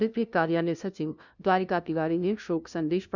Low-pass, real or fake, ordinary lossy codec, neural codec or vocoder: 7.2 kHz; fake; none; codec, 16 kHz, 0.5 kbps, FunCodec, trained on LibriTTS, 25 frames a second